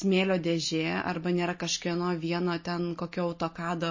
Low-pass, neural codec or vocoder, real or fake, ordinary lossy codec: 7.2 kHz; none; real; MP3, 32 kbps